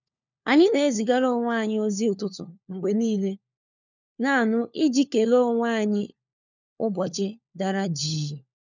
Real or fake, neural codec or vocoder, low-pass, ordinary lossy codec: fake; codec, 16 kHz, 4 kbps, FunCodec, trained on LibriTTS, 50 frames a second; 7.2 kHz; none